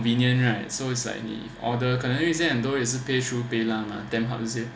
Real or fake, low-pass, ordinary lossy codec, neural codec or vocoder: real; none; none; none